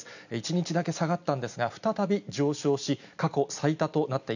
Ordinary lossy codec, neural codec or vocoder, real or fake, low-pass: none; none; real; 7.2 kHz